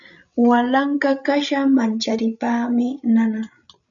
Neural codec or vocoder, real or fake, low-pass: codec, 16 kHz, 16 kbps, FreqCodec, larger model; fake; 7.2 kHz